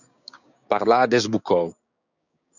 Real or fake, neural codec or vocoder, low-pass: fake; codec, 16 kHz, 6 kbps, DAC; 7.2 kHz